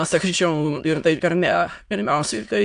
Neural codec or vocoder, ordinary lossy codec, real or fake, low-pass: autoencoder, 22.05 kHz, a latent of 192 numbers a frame, VITS, trained on many speakers; AAC, 64 kbps; fake; 9.9 kHz